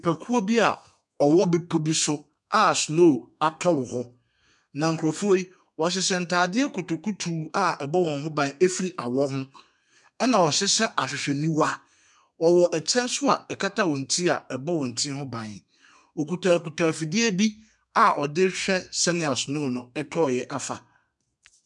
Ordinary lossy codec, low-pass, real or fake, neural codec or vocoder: MP3, 96 kbps; 10.8 kHz; fake; codec, 32 kHz, 1.9 kbps, SNAC